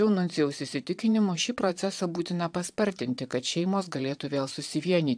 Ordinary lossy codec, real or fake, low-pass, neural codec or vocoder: AAC, 64 kbps; fake; 9.9 kHz; vocoder, 22.05 kHz, 80 mel bands, Vocos